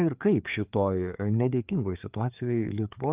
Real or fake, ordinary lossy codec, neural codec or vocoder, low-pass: fake; Opus, 24 kbps; codec, 16 kHz, 4 kbps, FreqCodec, larger model; 3.6 kHz